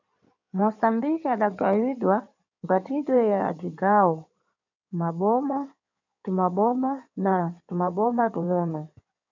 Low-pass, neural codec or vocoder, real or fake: 7.2 kHz; codec, 16 kHz in and 24 kHz out, 2.2 kbps, FireRedTTS-2 codec; fake